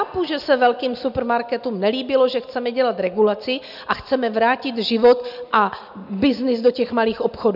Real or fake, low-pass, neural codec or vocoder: real; 5.4 kHz; none